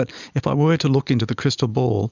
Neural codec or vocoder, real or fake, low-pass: none; real; 7.2 kHz